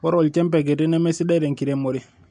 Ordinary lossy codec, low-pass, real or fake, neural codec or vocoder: MP3, 64 kbps; 9.9 kHz; real; none